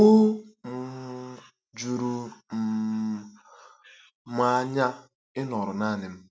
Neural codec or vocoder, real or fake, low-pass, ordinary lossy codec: none; real; none; none